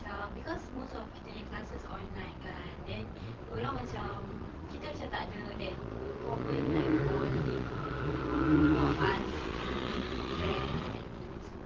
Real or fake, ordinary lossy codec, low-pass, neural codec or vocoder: fake; Opus, 16 kbps; 7.2 kHz; vocoder, 22.05 kHz, 80 mel bands, Vocos